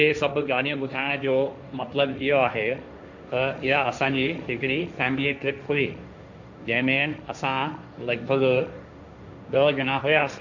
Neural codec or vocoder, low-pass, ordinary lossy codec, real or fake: codec, 16 kHz, 1.1 kbps, Voila-Tokenizer; 7.2 kHz; none; fake